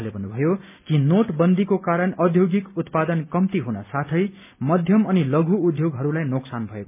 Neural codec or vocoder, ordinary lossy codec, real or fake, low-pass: none; MP3, 32 kbps; real; 3.6 kHz